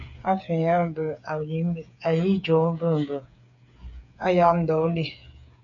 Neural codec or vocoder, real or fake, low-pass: codec, 16 kHz, 8 kbps, FreqCodec, smaller model; fake; 7.2 kHz